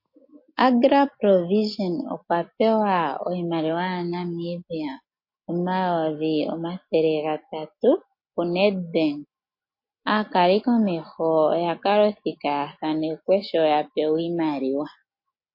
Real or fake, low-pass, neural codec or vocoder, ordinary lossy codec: real; 5.4 kHz; none; MP3, 32 kbps